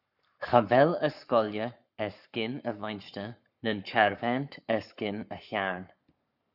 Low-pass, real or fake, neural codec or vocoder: 5.4 kHz; fake; codec, 44.1 kHz, 7.8 kbps, Pupu-Codec